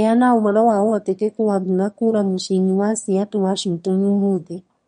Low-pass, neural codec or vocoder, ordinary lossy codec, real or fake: 9.9 kHz; autoencoder, 22.05 kHz, a latent of 192 numbers a frame, VITS, trained on one speaker; MP3, 48 kbps; fake